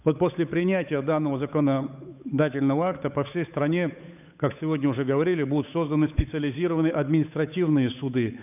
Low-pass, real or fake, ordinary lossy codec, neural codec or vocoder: 3.6 kHz; fake; none; codec, 16 kHz, 8 kbps, FunCodec, trained on Chinese and English, 25 frames a second